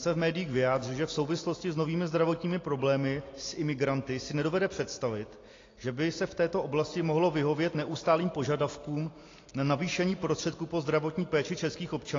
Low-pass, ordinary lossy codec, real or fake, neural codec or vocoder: 7.2 kHz; AAC, 32 kbps; real; none